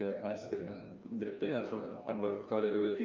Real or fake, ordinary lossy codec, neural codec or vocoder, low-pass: fake; Opus, 24 kbps; codec, 16 kHz, 1 kbps, FreqCodec, larger model; 7.2 kHz